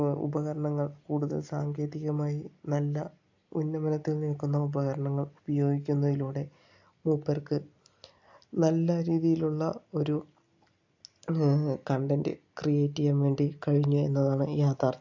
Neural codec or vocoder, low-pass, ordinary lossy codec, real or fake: none; 7.2 kHz; AAC, 48 kbps; real